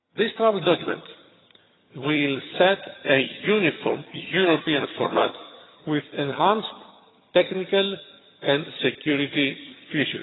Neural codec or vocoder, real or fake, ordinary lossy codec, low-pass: vocoder, 22.05 kHz, 80 mel bands, HiFi-GAN; fake; AAC, 16 kbps; 7.2 kHz